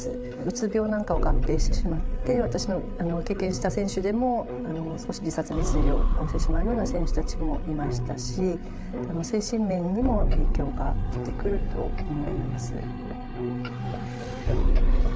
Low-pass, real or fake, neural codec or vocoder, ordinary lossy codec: none; fake; codec, 16 kHz, 8 kbps, FreqCodec, larger model; none